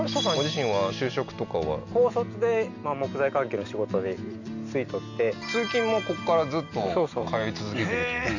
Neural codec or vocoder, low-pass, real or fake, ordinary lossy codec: none; 7.2 kHz; real; none